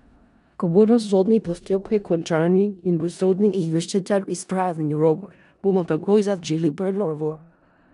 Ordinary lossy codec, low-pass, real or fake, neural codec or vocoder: none; 10.8 kHz; fake; codec, 16 kHz in and 24 kHz out, 0.4 kbps, LongCat-Audio-Codec, four codebook decoder